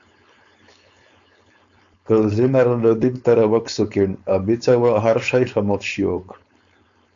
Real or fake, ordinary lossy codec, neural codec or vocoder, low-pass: fake; AAC, 64 kbps; codec, 16 kHz, 4.8 kbps, FACodec; 7.2 kHz